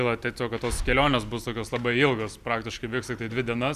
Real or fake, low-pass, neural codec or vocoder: real; 14.4 kHz; none